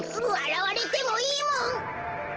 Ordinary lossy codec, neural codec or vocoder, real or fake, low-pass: Opus, 16 kbps; none; real; 7.2 kHz